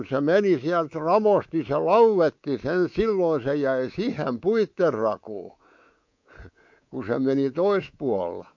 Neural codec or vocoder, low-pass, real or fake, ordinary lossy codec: codec, 24 kHz, 3.1 kbps, DualCodec; 7.2 kHz; fake; MP3, 48 kbps